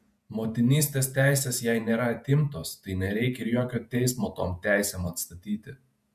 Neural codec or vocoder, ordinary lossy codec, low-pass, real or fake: vocoder, 48 kHz, 128 mel bands, Vocos; MP3, 96 kbps; 14.4 kHz; fake